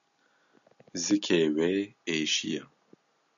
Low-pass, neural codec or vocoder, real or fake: 7.2 kHz; none; real